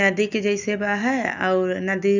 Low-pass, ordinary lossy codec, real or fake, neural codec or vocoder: 7.2 kHz; none; real; none